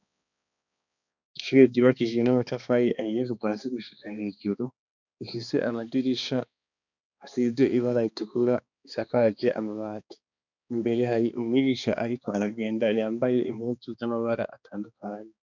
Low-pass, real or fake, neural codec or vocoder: 7.2 kHz; fake; codec, 16 kHz, 2 kbps, X-Codec, HuBERT features, trained on balanced general audio